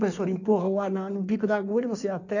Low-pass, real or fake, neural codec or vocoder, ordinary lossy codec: 7.2 kHz; fake; codec, 16 kHz in and 24 kHz out, 1.1 kbps, FireRedTTS-2 codec; none